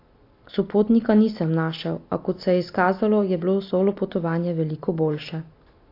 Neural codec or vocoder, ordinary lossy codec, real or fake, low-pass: none; AAC, 32 kbps; real; 5.4 kHz